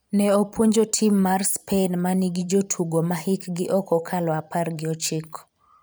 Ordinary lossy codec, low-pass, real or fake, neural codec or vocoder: none; none; fake; vocoder, 44.1 kHz, 128 mel bands every 512 samples, BigVGAN v2